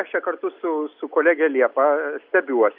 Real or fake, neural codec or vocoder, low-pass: real; none; 5.4 kHz